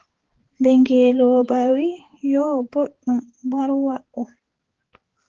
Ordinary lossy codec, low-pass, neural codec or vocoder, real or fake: Opus, 16 kbps; 7.2 kHz; codec, 16 kHz, 4 kbps, X-Codec, HuBERT features, trained on balanced general audio; fake